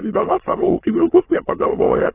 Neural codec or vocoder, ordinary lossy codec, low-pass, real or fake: autoencoder, 22.05 kHz, a latent of 192 numbers a frame, VITS, trained on many speakers; AAC, 16 kbps; 3.6 kHz; fake